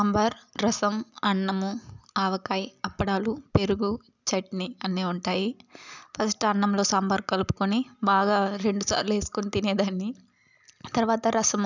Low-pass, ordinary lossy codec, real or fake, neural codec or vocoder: 7.2 kHz; none; real; none